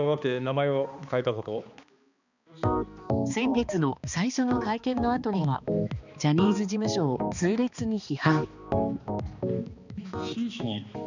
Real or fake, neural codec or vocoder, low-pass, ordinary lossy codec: fake; codec, 16 kHz, 2 kbps, X-Codec, HuBERT features, trained on balanced general audio; 7.2 kHz; none